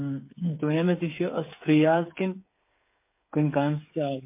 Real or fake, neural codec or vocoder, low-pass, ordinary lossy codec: fake; codec, 16 kHz, 8 kbps, FreqCodec, smaller model; 3.6 kHz; MP3, 24 kbps